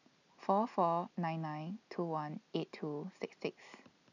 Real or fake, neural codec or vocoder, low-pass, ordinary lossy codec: real; none; 7.2 kHz; none